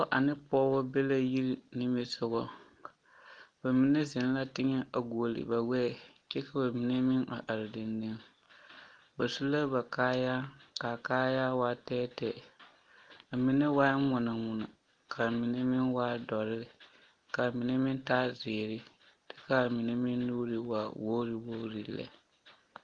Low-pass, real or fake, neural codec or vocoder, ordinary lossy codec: 9.9 kHz; real; none; Opus, 24 kbps